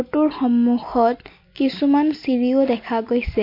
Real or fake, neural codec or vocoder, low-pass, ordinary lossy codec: real; none; 5.4 kHz; MP3, 32 kbps